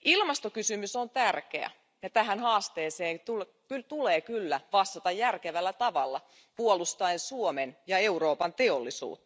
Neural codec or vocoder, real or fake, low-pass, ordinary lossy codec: none; real; none; none